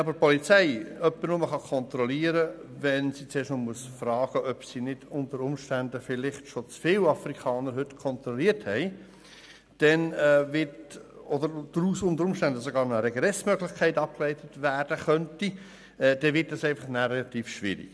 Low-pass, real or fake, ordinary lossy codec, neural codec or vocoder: none; real; none; none